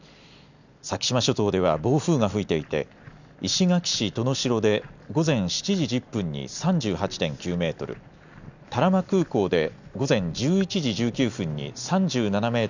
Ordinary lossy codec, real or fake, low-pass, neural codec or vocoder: none; real; 7.2 kHz; none